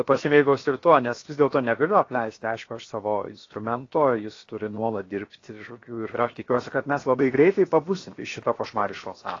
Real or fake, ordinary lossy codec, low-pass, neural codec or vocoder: fake; AAC, 32 kbps; 7.2 kHz; codec, 16 kHz, 0.7 kbps, FocalCodec